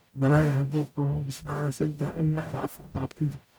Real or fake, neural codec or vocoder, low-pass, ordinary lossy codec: fake; codec, 44.1 kHz, 0.9 kbps, DAC; none; none